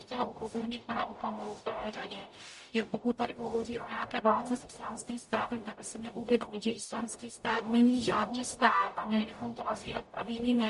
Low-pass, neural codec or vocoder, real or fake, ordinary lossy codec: 14.4 kHz; codec, 44.1 kHz, 0.9 kbps, DAC; fake; MP3, 48 kbps